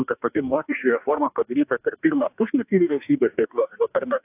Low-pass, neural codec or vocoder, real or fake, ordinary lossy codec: 3.6 kHz; codec, 44.1 kHz, 2.6 kbps, DAC; fake; AAC, 32 kbps